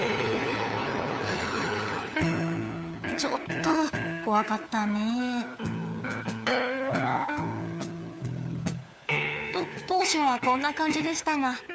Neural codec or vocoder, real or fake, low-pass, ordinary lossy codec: codec, 16 kHz, 4 kbps, FunCodec, trained on LibriTTS, 50 frames a second; fake; none; none